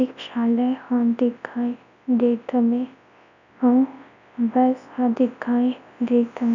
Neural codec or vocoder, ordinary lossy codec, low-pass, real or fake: codec, 24 kHz, 0.9 kbps, WavTokenizer, large speech release; none; 7.2 kHz; fake